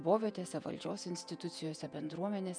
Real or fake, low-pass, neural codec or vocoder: fake; 9.9 kHz; vocoder, 48 kHz, 128 mel bands, Vocos